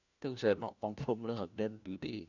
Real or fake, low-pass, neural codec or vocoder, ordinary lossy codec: fake; 7.2 kHz; codec, 16 kHz, 1 kbps, FunCodec, trained on LibriTTS, 50 frames a second; none